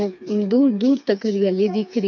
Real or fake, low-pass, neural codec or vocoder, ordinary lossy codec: fake; 7.2 kHz; codec, 16 kHz, 4 kbps, FreqCodec, smaller model; none